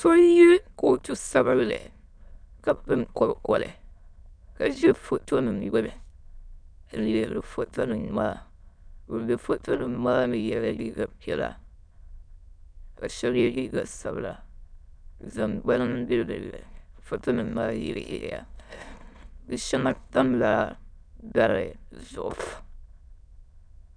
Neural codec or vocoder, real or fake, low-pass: autoencoder, 22.05 kHz, a latent of 192 numbers a frame, VITS, trained on many speakers; fake; 9.9 kHz